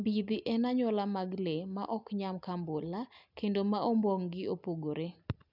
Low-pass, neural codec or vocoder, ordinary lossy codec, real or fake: 5.4 kHz; none; none; real